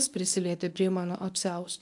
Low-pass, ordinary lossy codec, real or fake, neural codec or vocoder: 10.8 kHz; AAC, 64 kbps; fake; codec, 24 kHz, 0.9 kbps, WavTokenizer, medium speech release version 1